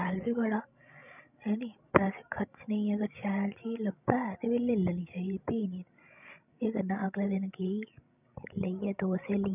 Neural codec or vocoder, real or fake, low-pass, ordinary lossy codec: codec, 16 kHz, 16 kbps, FreqCodec, larger model; fake; 3.6 kHz; none